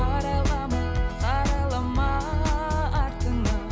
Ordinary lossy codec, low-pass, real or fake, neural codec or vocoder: none; none; real; none